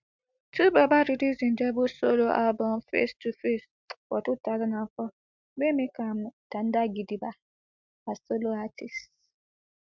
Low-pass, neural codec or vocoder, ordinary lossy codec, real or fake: 7.2 kHz; none; MP3, 64 kbps; real